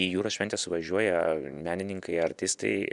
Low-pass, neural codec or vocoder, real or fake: 10.8 kHz; vocoder, 24 kHz, 100 mel bands, Vocos; fake